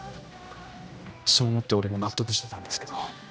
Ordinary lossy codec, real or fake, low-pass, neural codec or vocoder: none; fake; none; codec, 16 kHz, 1 kbps, X-Codec, HuBERT features, trained on general audio